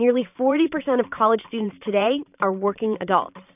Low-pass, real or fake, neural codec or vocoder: 3.6 kHz; fake; vocoder, 44.1 kHz, 128 mel bands every 512 samples, BigVGAN v2